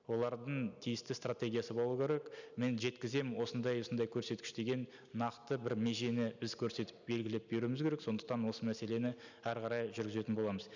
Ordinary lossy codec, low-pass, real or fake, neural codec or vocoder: none; 7.2 kHz; real; none